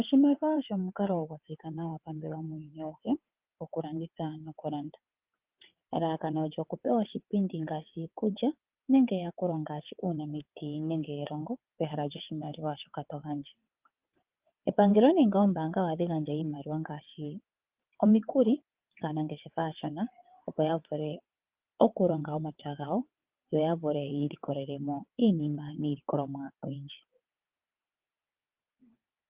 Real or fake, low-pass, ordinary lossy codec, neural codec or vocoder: fake; 3.6 kHz; Opus, 24 kbps; vocoder, 22.05 kHz, 80 mel bands, WaveNeXt